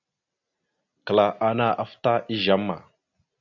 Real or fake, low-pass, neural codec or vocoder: real; 7.2 kHz; none